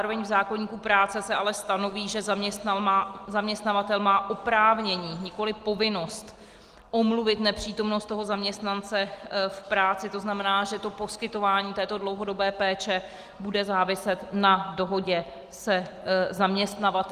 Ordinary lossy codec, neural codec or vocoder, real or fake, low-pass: Opus, 24 kbps; none; real; 14.4 kHz